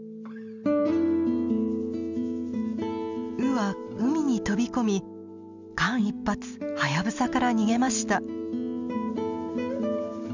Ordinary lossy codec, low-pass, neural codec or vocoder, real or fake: none; 7.2 kHz; none; real